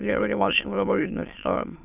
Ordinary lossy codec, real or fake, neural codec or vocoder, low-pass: none; fake; autoencoder, 22.05 kHz, a latent of 192 numbers a frame, VITS, trained on many speakers; 3.6 kHz